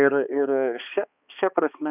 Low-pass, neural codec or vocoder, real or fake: 3.6 kHz; codec, 16 kHz, 2 kbps, X-Codec, HuBERT features, trained on balanced general audio; fake